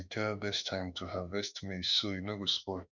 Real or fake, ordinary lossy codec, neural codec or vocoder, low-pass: fake; none; autoencoder, 48 kHz, 32 numbers a frame, DAC-VAE, trained on Japanese speech; 7.2 kHz